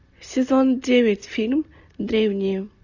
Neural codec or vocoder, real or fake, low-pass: none; real; 7.2 kHz